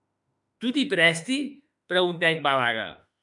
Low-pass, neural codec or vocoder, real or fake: 10.8 kHz; autoencoder, 48 kHz, 32 numbers a frame, DAC-VAE, trained on Japanese speech; fake